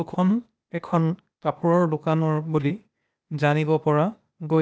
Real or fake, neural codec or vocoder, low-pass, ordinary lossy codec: fake; codec, 16 kHz, 0.8 kbps, ZipCodec; none; none